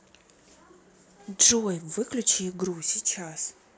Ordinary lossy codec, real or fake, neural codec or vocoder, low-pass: none; real; none; none